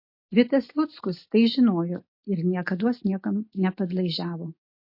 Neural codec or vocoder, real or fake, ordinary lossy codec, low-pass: none; real; MP3, 32 kbps; 5.4 kHz